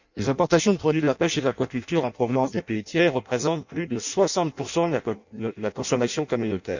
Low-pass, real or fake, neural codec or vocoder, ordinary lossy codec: 7.2 kHz; fake; codec, 16 kHz in and 24 kHz out, 0.6 kbps, FireRedTTS-2 codec; none